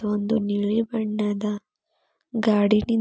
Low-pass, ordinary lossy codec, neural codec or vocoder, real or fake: none; none; none; real